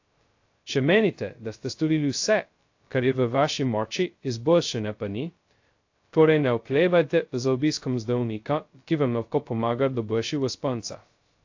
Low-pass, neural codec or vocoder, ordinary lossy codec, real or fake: 7.2 kHz; codec, 16 kHz, 0.2 kbps, FocalCodec; AAC, 48 kbps; fake